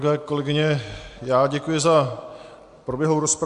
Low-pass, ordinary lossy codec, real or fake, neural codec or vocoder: 10.8 kHz; AAC, 64 kbps; real; none